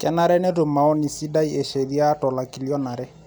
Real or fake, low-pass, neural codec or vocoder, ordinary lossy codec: real; none; none; none